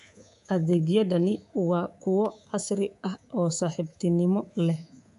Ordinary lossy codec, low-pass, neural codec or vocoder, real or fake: AAC, 64 kbps; 10.8 kHz; codec, 24 kHz, 3.1 kbps, DualCodec; fake